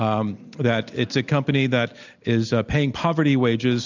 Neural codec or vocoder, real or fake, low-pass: none; real; 7.2 kHz